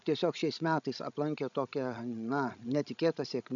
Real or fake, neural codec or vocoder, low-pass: fake; codec, 16 kHz, 16 kbps, FreqCodec, larger model; 7.2 kHz